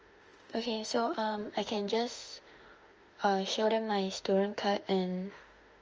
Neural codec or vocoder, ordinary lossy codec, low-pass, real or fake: autoencoder, 48 kHz, 32 numbers a frame, DAC-VAE, trained on Japanese speech; Opus, 24 kbps; 7.2 kHz; fake